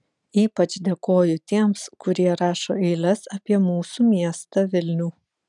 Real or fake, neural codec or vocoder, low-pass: real; none; 10.8 kHz